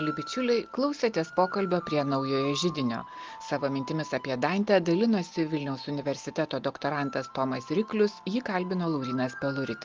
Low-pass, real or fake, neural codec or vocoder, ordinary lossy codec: 7.2 kHz; real; none; Opus, 32 kbps